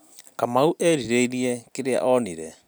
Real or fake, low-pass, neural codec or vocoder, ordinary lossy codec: real; none; none; none